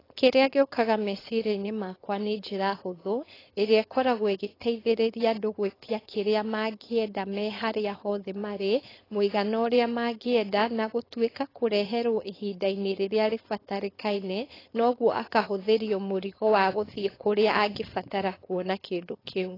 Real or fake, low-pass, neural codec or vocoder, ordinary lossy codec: fake; 5.4 kHz; codec, 16 kHz, 4.8 kbps, FACodec; AAC, 24 kbps